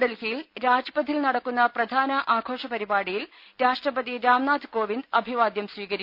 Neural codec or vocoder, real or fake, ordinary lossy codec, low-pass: none; real; none; 5.4 kHz